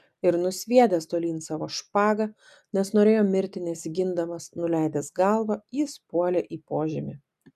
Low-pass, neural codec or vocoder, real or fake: 14.4 kHz; none; real